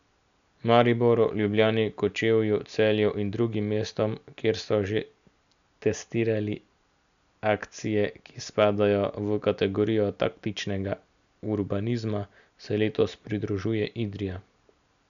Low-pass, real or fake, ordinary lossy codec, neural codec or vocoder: 7.2 kHz; real; none; none